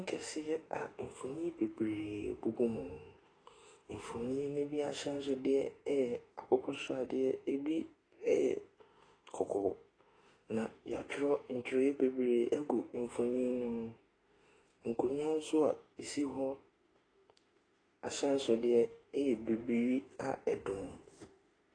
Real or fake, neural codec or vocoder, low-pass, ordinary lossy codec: fake; autoencoder, 48 kHz, 32 numbers a frame, DAC-VAE, trained on Japanese speech; 9.9 kHz; AAC, 32 kbps